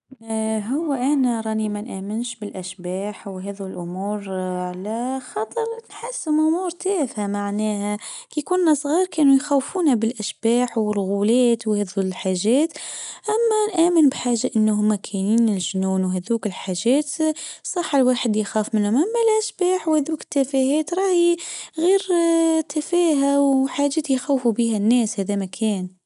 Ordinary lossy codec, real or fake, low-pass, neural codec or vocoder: none; real; 10.8 kHz; none